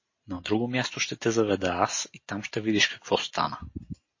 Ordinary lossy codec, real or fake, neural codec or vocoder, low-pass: MP3, 32 kbps; real; none; 7.2 kHz